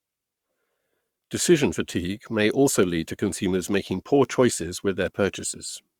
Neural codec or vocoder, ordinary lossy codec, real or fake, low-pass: codec, 44.1 kHz, 7.8 kbps, Pupu-Codec; Opus, 64 kbps; fake; 19.8 kHz